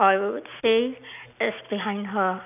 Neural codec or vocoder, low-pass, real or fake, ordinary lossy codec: none; 3.6 kHz; real; none